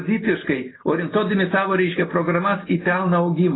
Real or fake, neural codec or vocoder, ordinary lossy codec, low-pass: real; none; AAC, 16 kbps; 7.2 kHz